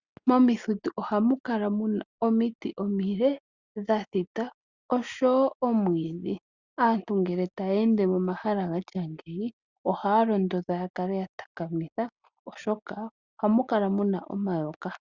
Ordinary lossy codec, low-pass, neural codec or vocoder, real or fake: Opus, 64 kbps; 7.2 kHz; none; real